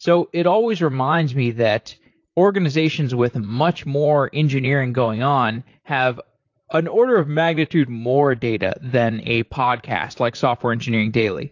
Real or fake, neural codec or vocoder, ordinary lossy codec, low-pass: fake; vocoder, 44.1 kHz, 128 mel bands, Pupu-Vocoder; AAC, 48 kbps; 7.2 kHz